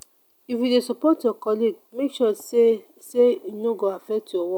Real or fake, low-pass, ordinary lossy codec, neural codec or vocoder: real; 19.8 kHz; none; none